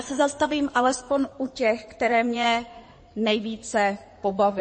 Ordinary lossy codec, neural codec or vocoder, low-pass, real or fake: MP3, 32 kbps; codec, 16 kHz in and 24 kHz out, 2.2 kbps, FireRedTTS-2 codec; 9.9 kHz; fake